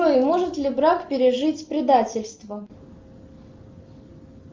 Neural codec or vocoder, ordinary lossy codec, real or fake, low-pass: none; Opus, 32 kbps; real; 7.2 kHz